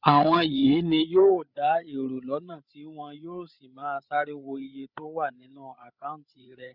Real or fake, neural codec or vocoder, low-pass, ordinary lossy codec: fake; codec, 16 kHz, 8 kbps, FreqCodec, larger model; 5.4 kHz; none